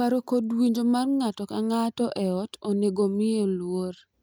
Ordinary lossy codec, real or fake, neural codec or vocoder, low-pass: none; real; none; none